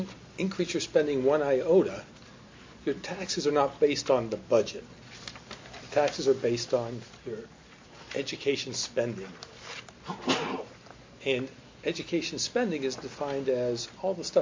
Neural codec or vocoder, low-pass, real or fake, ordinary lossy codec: none; 7.2 kHz; real; MP3, 48 kbps